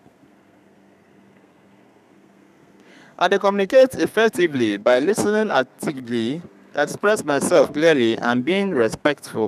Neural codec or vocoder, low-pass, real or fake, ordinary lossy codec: codec, 32 kHz, 1.9 kbps, SNAC; 14.4 kHz; fake; none